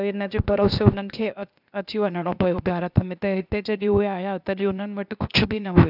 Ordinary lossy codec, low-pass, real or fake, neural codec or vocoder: none; 5.4 kHz; fake; codec, 16 kHz, 0.8 kbps, ZipCodec